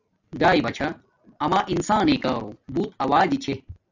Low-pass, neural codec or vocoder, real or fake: 7.2 kHz; none; real